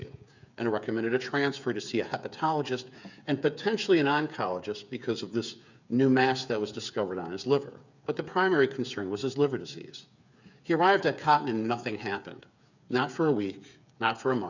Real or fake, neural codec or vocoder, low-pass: fake; codec, 16 kHz, 8 kbps, FreqCodec, smaller model; 7.2 kHz